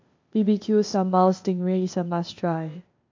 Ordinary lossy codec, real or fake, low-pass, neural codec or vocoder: MP3, 48 kbps; fake; 7.2 kHz; codec, 16 kHz, 0.8 kbps, ZipCodec